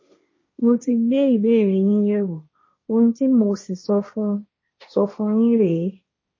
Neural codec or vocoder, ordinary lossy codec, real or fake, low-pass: codec, 16 kHz, 1.1 kbps, Voila-Tokenizer; MP3, 32 kbps; fake; 7.2 kHz